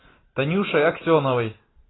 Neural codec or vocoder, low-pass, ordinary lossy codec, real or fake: none; 7.2 kHz; AAC, 16 kbps; real